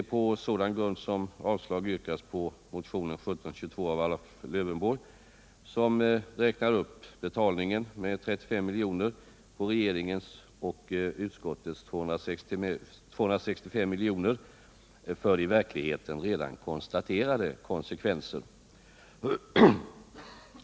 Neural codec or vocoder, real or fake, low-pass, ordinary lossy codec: none; real; none; none